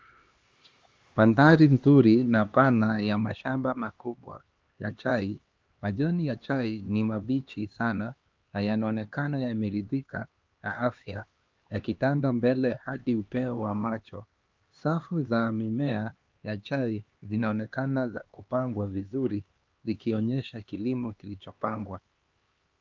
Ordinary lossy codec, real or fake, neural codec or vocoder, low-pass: Opus, 32 kbps; fake; codec, 16 kHz, 2 kbps, X-Codec, HuBERT features, trained on LibriSpeech; 7.2 kHz